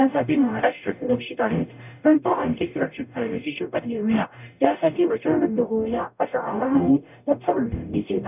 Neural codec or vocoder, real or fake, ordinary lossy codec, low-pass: codec, 44.1 kHz, 0.9 kbps, DAC; fake; none; 3.6 kHz